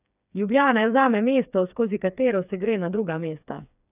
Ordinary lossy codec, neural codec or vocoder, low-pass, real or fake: none; codec, 16 kHz, 4 kbps, FreqCodec, smaller model; 3.6 kHz; fake